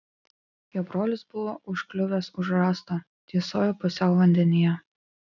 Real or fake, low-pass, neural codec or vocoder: real; 7.2 kHz; none